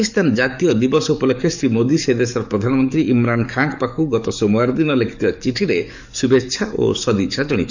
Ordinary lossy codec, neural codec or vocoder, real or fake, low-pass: none; codec, 44.1 kHz, 7.8 kbps, DAC; fake; 7.2 kHz